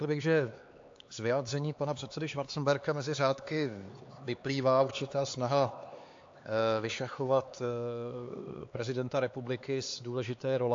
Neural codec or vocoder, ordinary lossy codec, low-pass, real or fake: codec, 16 kHz, 4 kbps, X-Codec, HuBERT features, trained on LibriSpeech; AAC, 48 kbps; 7.2 kHz; fake